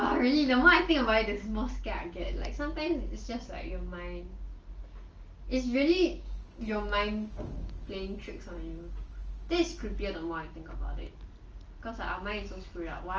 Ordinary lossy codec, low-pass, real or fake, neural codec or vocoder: Opus, 24 kbps; 7.2 kHz; real; none